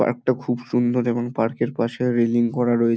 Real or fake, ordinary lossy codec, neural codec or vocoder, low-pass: real; none; none; none